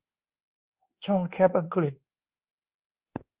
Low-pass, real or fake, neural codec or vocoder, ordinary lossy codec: 3.6 kHz; fake; codec, 24 kHz, 1.2 kbps, DualCodec; Opus, 24 kbps